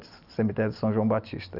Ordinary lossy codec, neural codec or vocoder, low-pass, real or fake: none; none; 5.4 kHz; real